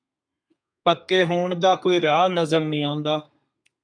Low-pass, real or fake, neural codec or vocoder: 9.9 kHz; fake; codec, 32 kHz, 1.9 kbps, SNAC